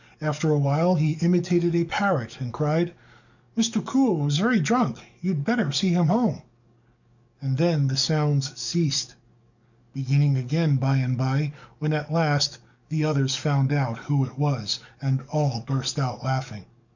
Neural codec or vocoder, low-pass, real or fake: codec, 44.1 kHz, 7.8 kbps, DAC; 7.2 kHz; fake